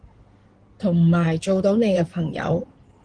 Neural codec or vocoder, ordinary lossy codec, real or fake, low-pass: codec, 16 kHz in and 24 kHz out, 2.2 kbps, FireRedTTS-2 codec; Opus, 16 kbps; fake; 9.9 kHz